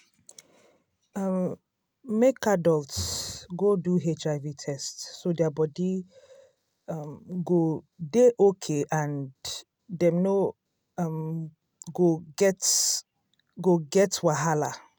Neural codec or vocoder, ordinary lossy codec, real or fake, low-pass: none; none; real; none